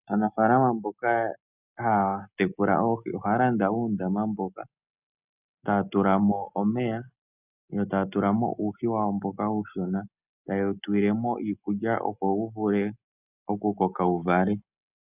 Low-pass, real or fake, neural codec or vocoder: 3.6 kHz; real; none